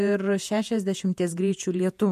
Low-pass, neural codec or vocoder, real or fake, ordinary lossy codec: 14.4 kHz; vocoder, 48 kHz, 128 mel bands, Vocos; fake; MP3, 64 kbps